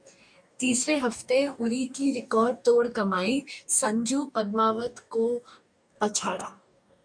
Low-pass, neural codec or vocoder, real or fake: 9.9 kHz; codec, 44.1 kHz, 2.6 kbps, DAC; fake